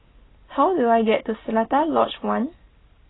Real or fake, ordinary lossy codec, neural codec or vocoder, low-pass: real; AAC, 16 kbps; none; 7.2 kHz